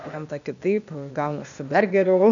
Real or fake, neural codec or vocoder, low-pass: fake; codec, 16 kHz, 0.8 kbps, ZipCodec; 7.2 kHz